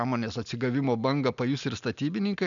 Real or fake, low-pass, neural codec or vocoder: real; 7.2 kHz; none